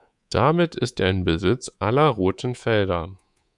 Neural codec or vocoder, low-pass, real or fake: codec, 24 kHz, 3.1 kbps, DualCodec; 10.8 kHz; fake